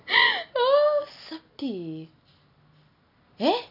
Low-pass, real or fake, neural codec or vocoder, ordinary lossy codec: 5.4 kHz; real; none; none